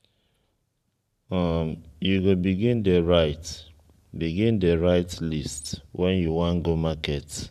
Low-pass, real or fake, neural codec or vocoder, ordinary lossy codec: 14.4 kHz; fake; vocoder, 44.1 kHz, 128 mel bands every 512 samples, BigVGAN v2; none